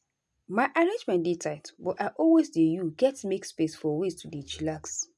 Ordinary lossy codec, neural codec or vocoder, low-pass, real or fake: none; none; none; real